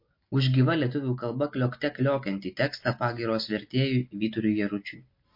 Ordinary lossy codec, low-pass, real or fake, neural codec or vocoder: MP3, 32 kbps; 5.4 kHz; real; none